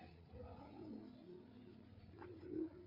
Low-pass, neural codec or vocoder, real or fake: 5.4 kHz; codec, 16 kHz, 8 kbps, FreqCodec, larger model; fake